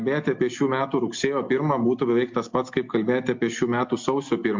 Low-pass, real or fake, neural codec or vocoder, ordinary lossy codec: 7.2 kHz; real; none; MP3, 48 kbps